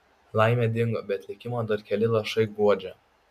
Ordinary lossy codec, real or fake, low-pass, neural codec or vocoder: MP3, 96 kbps; real; 14.4 kHz; none